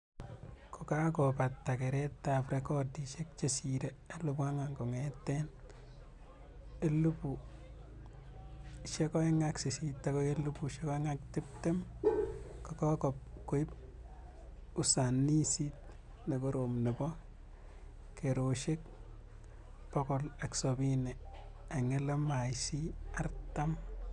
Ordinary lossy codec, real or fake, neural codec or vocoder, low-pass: none; real; none; 10.8 kHz